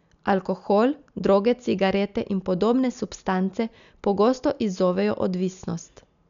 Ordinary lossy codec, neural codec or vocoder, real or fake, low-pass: none; none; real; 7.2 kHz